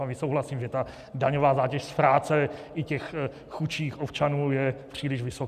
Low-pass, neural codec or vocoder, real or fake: 14.4 kHz; none; real